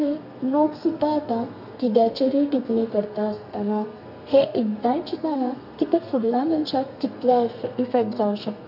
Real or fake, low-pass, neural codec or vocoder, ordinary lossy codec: fake; 5.4 kHz; codec, 32 kHz, 1.9 kbps, SNAC; none